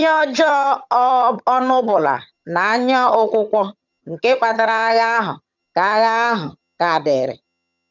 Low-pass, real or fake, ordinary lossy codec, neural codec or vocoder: 7.2 kHz; fake; none; vocoder, 22.05 kHz, 80 mel bands, HiFi-GAN